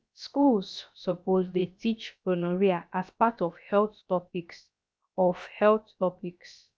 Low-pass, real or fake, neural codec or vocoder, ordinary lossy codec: none; fake; codec, 16 kHz, about 1 kbps, DyCAST, with the encoder's durations; none